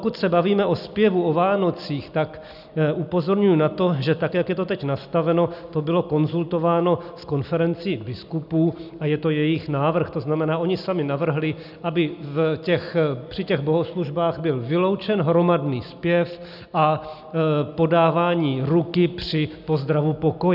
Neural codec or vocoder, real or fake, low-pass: none; real; 5.4 kHz